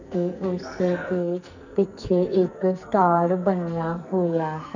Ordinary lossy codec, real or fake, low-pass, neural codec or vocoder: none; fake; 7.2 kHz; codec, 44.1 kHz, 2.6 kbps, SNAC